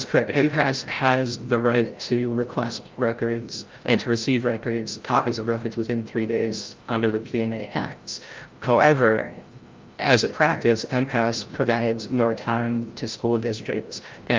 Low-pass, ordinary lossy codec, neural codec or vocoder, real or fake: 7.2 kHz; Opus, 24 kbps; codec, 16 kHz, 0.5 kbps, FreqCodec, larger model; fake